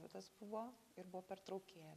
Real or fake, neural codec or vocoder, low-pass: real; none; 14.4 kHz